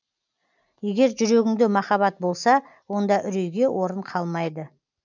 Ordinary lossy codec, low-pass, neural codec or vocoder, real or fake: none; 7.2 kHz; none; real